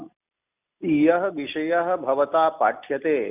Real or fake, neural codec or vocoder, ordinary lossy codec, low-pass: real; none; none; 3.6 kHz